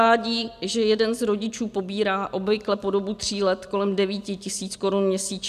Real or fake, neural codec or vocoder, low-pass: real; none; 14.4 kHz